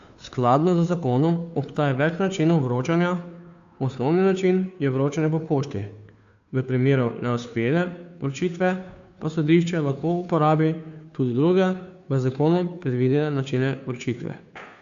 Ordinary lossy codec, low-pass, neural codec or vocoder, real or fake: AAC, 96 kbps; 7.2 kHz; codec, 16 kHz, 2 kbps, FunCodec, trained on Chinese and English, 25 frames a second; fake